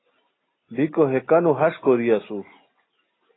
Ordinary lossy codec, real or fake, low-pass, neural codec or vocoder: AAC, 16 kbps; real; 7.2 kHz; none